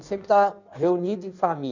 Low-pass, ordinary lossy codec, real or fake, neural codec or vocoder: 7.2 kHz; none; fake; codec, 16 kHz in and 24 kHz out, 1.1 kbps, FireRedTTS-2 codec